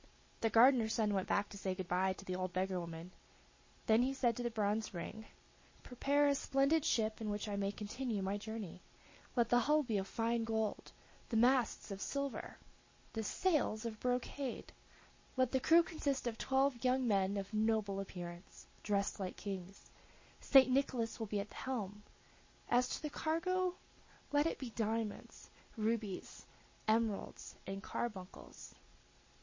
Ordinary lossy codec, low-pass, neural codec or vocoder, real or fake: MP3, 32 kbps; 7.2 kHz; none; real